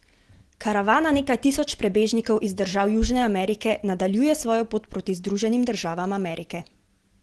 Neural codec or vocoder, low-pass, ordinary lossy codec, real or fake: none; 10.8 kHz; Opus, 16 kbps; real